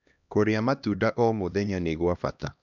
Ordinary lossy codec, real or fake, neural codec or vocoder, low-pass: none; fake; codec, 16 kHz, 1 kbps, X-Codec, HuBERT features, trained on LibriSpeech; 7.2 kHz